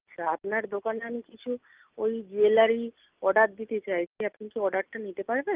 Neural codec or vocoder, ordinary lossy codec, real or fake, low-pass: none; Opus, 32 kbps; real; 3.6 kHz